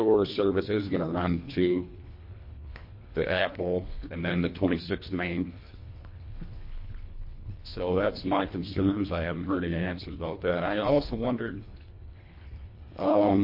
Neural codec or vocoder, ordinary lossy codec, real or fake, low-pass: codec, 24 kHz, 1.5 kbps, HILCodec; MP3, 32 kbps; fake; 5.4 kHz